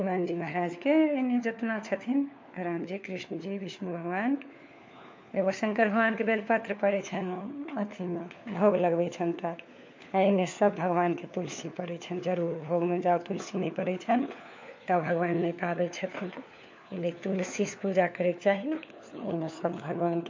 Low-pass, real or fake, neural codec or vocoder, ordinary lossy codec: 7.2 kHz; fake; codec, 16 kHz, 4 kbps, FunCodec, trained on LibriTTS, 50 frames a second; MP3, 48 kbps